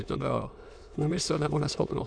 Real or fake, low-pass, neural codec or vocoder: fake; 9.9 kHz; autoencoder, 22.05 kHz, a latent of 192 numbers a frame, VITS, trained on many speakers